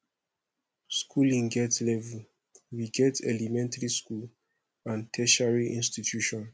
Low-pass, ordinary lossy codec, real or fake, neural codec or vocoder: none; none; real; none